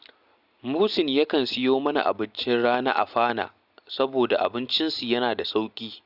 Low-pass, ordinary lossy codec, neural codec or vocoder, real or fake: 5.4 kHz; Opus, 64 kbps; none; real